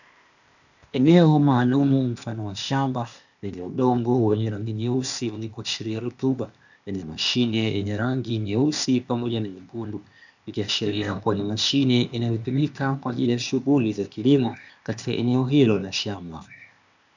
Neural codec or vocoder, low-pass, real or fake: codec, 16 kHz, 0.8 kbps, ZipCodec; 7.2 kHz; fake